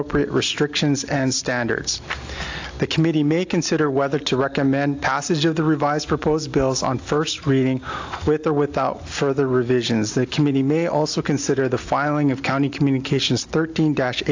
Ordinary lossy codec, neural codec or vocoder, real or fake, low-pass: AAC, 48 kbps; none; real; 7.2 kHz